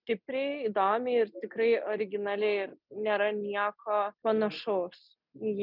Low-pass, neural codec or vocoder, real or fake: 5.4 kHz; none; real